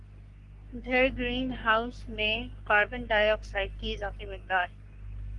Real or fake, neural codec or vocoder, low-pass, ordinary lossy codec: fake; codec, 44.1 kHz, 3.4 kbps, Pupu-Codec; 10.8 kHz; Opus, 24 kbps